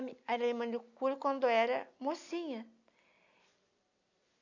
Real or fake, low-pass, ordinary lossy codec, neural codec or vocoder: real; 7.2 kHz; none; none